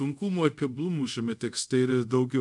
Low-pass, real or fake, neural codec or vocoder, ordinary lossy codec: 10.8 kHz; fake; codec, 24 kHz, 0.5 kbps, DualCodec; MP3, 96 kbps